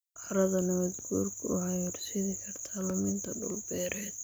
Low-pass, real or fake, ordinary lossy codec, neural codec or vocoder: none; real; none; none